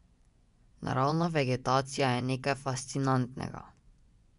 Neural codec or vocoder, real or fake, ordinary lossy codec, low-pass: vocoder, 24 kHz, 100 mel bands, Vocos; fake; none; 10.8 kHz